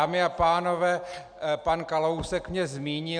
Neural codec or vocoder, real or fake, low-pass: none; real; 9.9 kHz